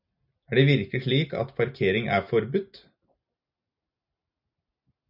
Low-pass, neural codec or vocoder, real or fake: 5.4 kHz; none; real